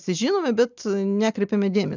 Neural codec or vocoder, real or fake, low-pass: none; real; 7.2 kHz